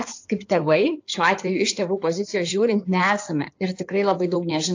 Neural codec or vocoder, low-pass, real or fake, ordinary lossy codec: codec, 16 kHz in and 24 kHz out, 2.2 kbps, FireRedTTS-2 codec; 7.2 kHz; fake; AAC, 48 kbps